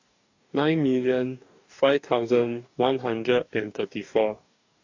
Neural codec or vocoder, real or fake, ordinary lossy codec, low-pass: codec, 44.1 kHz, 2.6 kbps, DAC; fake; AAC, 48 kbps; 7.2 kHz